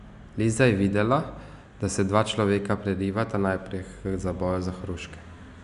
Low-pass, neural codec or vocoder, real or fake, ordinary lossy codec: 10.8 kHz; none; real; none